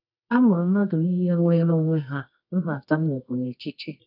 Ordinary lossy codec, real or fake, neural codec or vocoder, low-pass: none; fake; codec, 24 kHz, 0.9 kbps, WavTokenizer, medium music audio release; 5.4 kHz